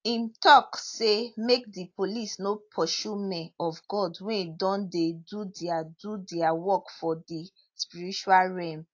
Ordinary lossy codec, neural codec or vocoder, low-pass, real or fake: AAC, 48 kbps; none; 7.2 kHz; real